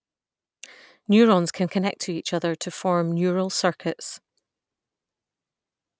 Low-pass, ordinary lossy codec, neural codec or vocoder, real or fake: none; none; none; real